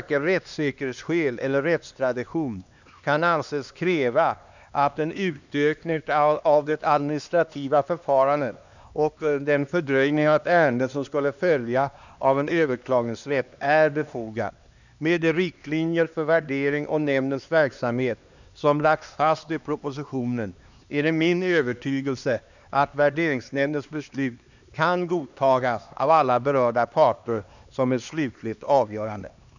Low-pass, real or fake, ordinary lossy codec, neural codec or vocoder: 7.2 kHz; fake; none; codec, 16 kHz, 2 kbps, X-Codec, HuBERT features, trained on LibriSpeech